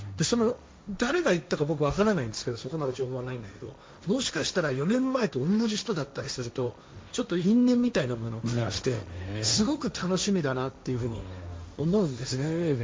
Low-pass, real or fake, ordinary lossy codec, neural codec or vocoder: none; fake; none; codec, 16 kHz, 1.1 kbps, Voila-Tokenizer